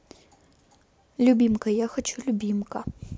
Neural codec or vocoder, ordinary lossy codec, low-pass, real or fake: none; none; none; real